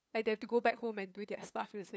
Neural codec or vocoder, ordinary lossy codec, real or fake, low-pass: codec, 16 kHz, 2 kbps, FunCodec, trained on LibriTTS, 25 frames a second; none; fake; none